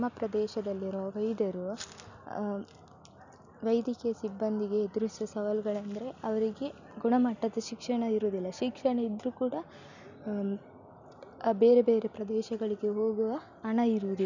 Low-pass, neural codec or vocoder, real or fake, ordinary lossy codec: 7.2 kHz; none; real; none